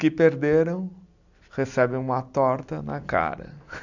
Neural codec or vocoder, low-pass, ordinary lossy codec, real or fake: none; 7.2 kHz; none; real